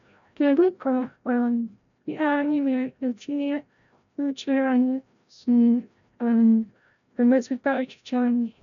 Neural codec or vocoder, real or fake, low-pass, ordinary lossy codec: codec, 16 kHz, 0.5 kbps, FreqCodec, larger model; fake; 7.2 kHz; none